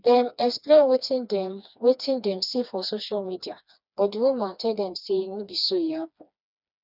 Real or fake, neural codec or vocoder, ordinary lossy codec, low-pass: fake; codec, 16 kHz, 2 kbps, FreqCodec, smaller model; none; 5.4 kHz